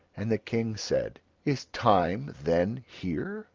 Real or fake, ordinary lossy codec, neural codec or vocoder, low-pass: real; Opus, 32 kbps; none; 7.2 kHz